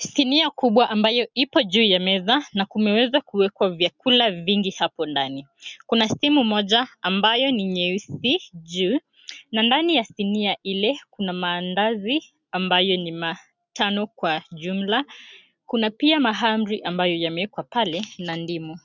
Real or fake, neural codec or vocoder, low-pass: real; none; 7.2 kHz